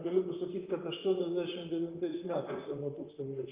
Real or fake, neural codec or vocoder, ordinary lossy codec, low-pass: fake; vocoder, 44.1 kHz, 128 mel bands, Pupu-Vocoder; Opus, 24 kbps; 3.6 kHz